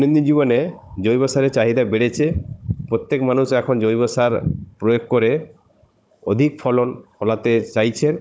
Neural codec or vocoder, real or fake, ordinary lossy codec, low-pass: codec, 16 kHz, 16 kbps, FunCodec, trained on Chinese and English, 50 frames a second; fake; none; none